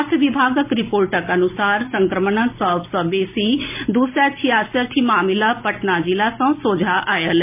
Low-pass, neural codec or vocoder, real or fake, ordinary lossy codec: 3.6 kHz; none; real; MP3, 32 kbps